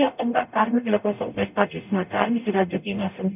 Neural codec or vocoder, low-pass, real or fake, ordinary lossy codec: codec, 44.1 kHz, 0.9 kbps, DAC; 3.6 kHz; fake; none